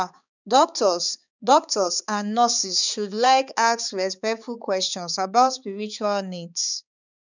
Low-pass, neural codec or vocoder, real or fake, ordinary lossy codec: 7.2 kHz; codec, 16 kHz, 4 kbps, X-Codec, HuBERT features, trained on balanced general audio; fake; none